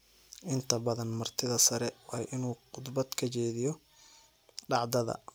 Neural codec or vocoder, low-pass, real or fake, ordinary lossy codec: none; none; real; none